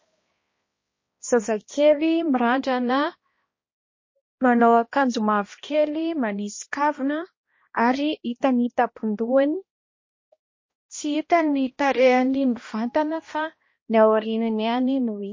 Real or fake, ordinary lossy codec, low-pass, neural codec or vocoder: fake; MP3, 32 kbps; 7.2 kHz; codec, 16 kHz, 1 kbps, X-Codec, HuBERT features, trained on balanced general audio